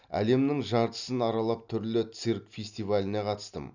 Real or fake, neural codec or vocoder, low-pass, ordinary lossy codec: real; none; 7.2 kHz; none